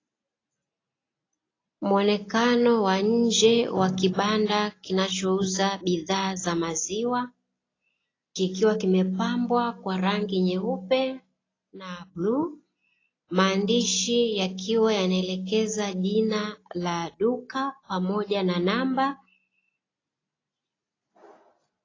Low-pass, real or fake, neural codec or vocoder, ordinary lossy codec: 7.2 kHz; real; none; AAC, 32 kbps